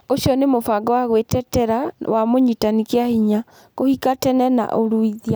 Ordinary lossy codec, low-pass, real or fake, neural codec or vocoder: none; none; real; none